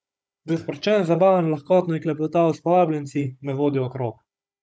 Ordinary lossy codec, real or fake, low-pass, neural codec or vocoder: none; fake; none; codec, 16 kHz, 16 kbps, FunCodec, trained on Chinese and English, 50 frames a second